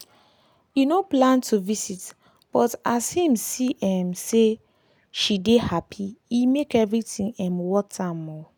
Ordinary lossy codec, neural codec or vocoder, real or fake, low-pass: none; none; real; none